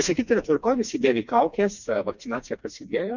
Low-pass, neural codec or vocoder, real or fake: 7.2 kHz; codec, 16 kHz, 2 kbps, FreqCodec, smaller model; fake